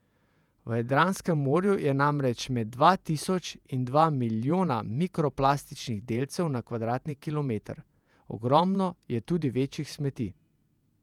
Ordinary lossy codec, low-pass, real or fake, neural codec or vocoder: none; 19.8 kHz; fake; vocoder, 48 kHz, 128 mel bands, Vocos